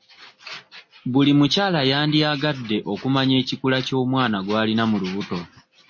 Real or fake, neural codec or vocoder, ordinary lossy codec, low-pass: real; none; MP3, 32 kbps; 7.2 kHz